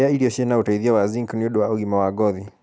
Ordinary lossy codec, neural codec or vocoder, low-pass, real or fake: none; none; none; real